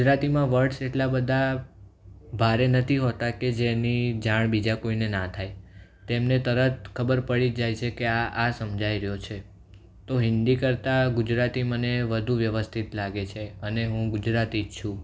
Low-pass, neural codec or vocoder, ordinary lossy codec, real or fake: none; none; none; real